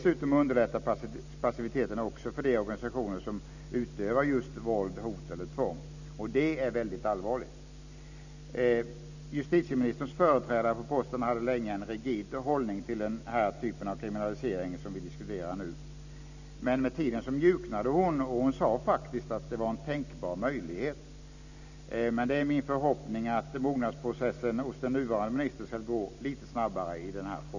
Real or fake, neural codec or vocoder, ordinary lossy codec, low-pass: real; none; none; 7.2 kHz